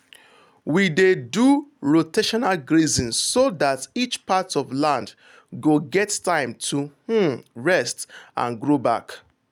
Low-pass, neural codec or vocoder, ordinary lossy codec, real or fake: 19.8 kHz; none; none; real